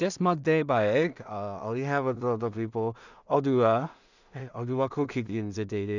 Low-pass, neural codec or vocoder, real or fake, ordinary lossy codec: 7.2 kHz; codec, 16 kHz in and 24 kHz out, 0.4 kbps, LongCat-Audio-Codec, two codebook decoder; fake; none